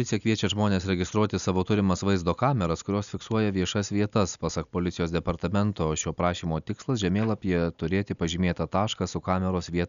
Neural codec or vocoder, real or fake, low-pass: none; real; 7.2 kHz